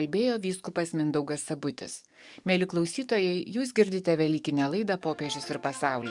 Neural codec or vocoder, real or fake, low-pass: codec, 44.1 kHz, 7.8 kbps, DAC; fake; 10.8 kHz